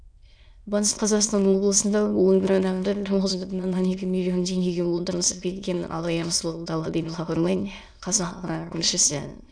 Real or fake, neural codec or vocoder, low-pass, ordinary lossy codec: fake; autoencoder, 22.05 kHz, a latent of 192 numbers a frame, VITS, trained on many speakers; 9.9 kHz; none